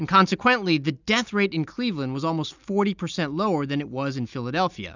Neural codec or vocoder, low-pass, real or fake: none; 7.2 kHz; real